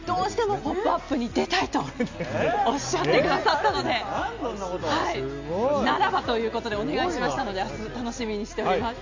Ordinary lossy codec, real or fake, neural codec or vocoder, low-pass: MP3, 48 kbps; real; none; 7.2 kHz